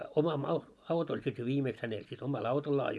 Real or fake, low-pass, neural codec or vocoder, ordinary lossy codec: real; none; none; none